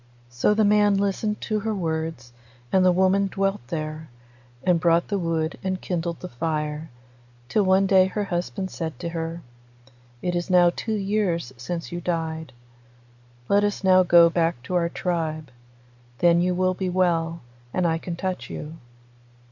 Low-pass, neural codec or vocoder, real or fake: 7.2 kHz; none; real